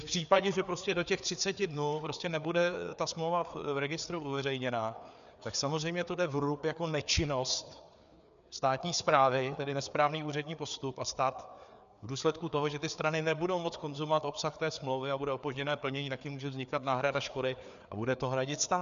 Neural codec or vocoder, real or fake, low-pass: codec, 16 kHz, 4 kbps, FreqCodec, larger model; fake; 7.2 kHz